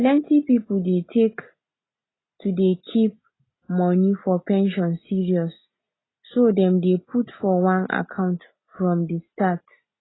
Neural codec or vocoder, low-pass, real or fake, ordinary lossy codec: none; 7.2 kHz; real; AAC, 16 kbps